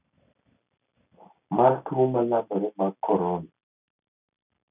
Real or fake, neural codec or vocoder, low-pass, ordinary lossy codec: real; none; 3.6 kHz; none